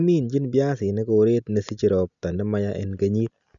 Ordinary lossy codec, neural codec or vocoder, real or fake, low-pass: none; none; real; 7.2 kHz